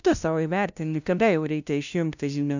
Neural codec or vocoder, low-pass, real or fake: codec, 16 kHz, 0.5 kbps, FunCodec, trained on LibriTTS, 25 frames a second; 7.2 kHz; fake